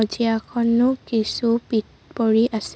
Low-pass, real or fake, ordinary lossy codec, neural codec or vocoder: none; real; none; none